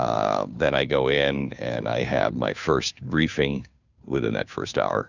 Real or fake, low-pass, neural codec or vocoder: fake; 7.2 kHz; codec, 16 kHz, 2 kbps, FunCodec, trained on Chinese and English, 25 frames a second